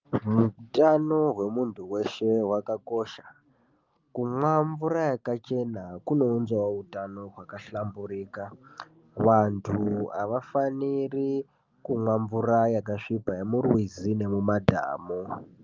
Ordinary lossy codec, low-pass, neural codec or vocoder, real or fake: Opus, 24 kbps; 7.2 kHz; none; real